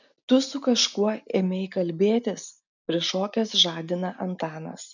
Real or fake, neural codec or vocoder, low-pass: real; none; 7.2 kHz